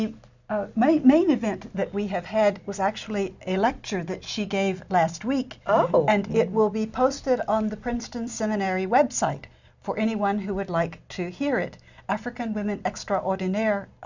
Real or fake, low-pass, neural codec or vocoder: real; 7.2 kHz; none